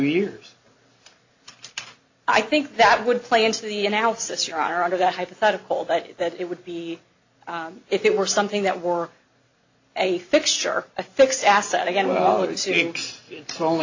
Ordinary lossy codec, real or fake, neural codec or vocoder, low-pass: AAC, 48 kbps; real; none; 7.2 kHz